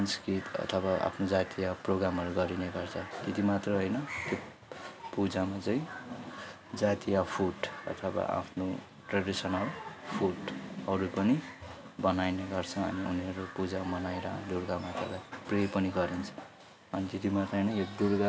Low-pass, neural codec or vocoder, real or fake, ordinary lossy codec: none; none; real; none